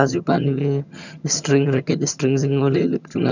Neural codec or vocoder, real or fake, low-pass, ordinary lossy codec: vocoder, 22.05 kHz, 80 mel bands, HiFi-GAN; fake; 7.2 kHz; none